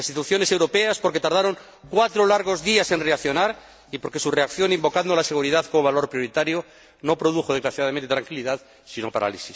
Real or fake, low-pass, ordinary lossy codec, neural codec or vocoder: real; none; none; none